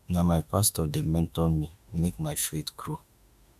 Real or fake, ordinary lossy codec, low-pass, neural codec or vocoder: fake; none; 14.4 kHz; autoencoder, 48 kHz, 32 numbers a frame, DAC-VAE, trained on Japanese speech